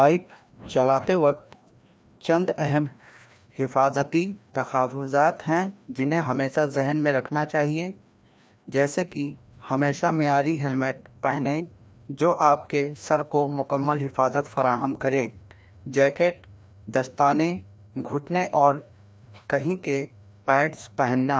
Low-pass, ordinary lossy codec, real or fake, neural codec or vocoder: none; none; fake; codec, 16 kHz, 1 kbps, FreqCodec, larger model